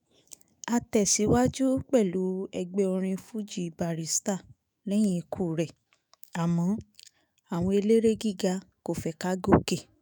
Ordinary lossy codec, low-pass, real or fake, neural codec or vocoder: none; none; fake; autoencoder, 48 kHz, 128 numbers a frame, DAC-VAE, trained on Japanese speech